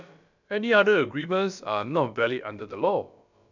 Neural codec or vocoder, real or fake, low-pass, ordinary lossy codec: codec, 16 kHz, about 1 kbps, DyCAST, with the encoder's durations; fake; 7.2 kHz; none